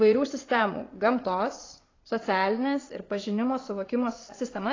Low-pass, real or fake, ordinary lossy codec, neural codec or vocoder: 7.2 kHz; fake; AAC, 32 kbps; vocoder, 22.05 kHz, 80 mel bands, Vocos